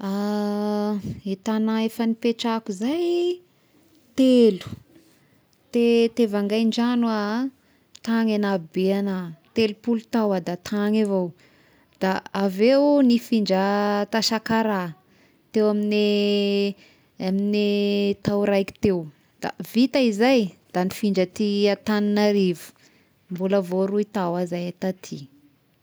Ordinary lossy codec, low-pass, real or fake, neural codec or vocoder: none; none; real; none